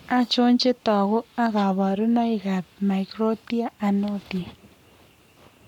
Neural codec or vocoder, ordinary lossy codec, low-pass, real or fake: codec, 44.1 kHz, 7.8 kbps, Pupu-Codec; none; 19.8 kHz; fake